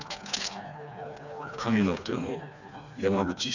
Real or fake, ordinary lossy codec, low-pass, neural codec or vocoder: fake; none; 7.2 kHz; codec, 16 kHz, 2 kbps, FreqCodec, smaller model